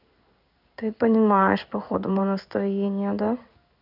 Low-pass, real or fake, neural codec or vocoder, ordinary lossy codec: 5.4 kHz; fake; codec, 16 kHz in and 24 kHz out, 1 kbps, XY-Tokenizer; none